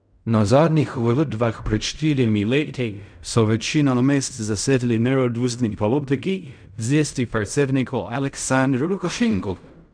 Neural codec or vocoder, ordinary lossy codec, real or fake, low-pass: codec, 16 kHz in and 24 kHz out, 0.4 kbps, LongCat-Audio-Codec, fine tuned four codebook decoder; none; fake; 9.9 kHz